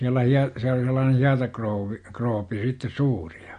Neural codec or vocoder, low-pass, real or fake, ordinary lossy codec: none; 9.9 kHz; real; MP3, 48 kbps